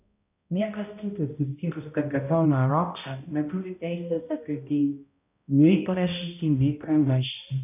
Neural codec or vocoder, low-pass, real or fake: codec, 16 kHz, 0.5 kbps, X-Codec, HuBERT features, trained on balanced general audio; 3.6 kHz; fake